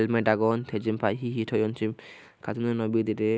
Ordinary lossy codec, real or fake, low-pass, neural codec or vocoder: none; real; none; none